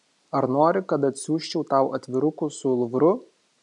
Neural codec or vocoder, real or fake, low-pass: none; real; 10.8 kHz